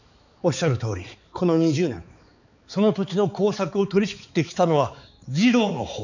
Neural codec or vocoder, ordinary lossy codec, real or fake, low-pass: codec, 16 kHz, 4 kbps, X-Codec, HuBERT features, trained on balanced general audio; none; fake; 7.2 kHz